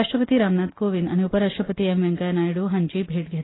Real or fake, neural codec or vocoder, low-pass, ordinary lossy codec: real; none; 7.2 kHz; AAC, 16 kbps